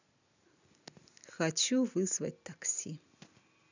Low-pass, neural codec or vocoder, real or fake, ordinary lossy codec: 7.2 kHz; none; real; none